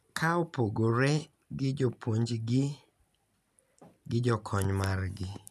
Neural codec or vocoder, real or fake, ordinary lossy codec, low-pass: none; real; none; 14.4 kHz